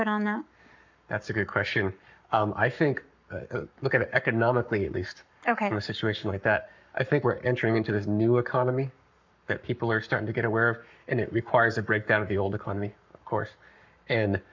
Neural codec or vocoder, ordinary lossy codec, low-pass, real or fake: codec, 44.1 kHz, 7.8 kbps, Pupu-Codec; MP3, 64 kbps; 7.2 kHz; fake